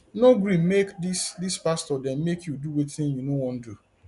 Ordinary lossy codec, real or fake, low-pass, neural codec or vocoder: none; real; 10.8 kHz; none